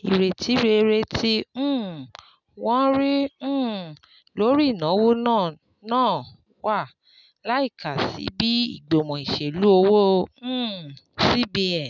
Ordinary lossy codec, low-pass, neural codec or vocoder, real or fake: none; 7.2 kHz; none; real